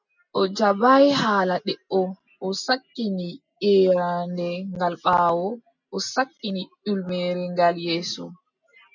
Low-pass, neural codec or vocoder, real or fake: 7.2 kHz; none; real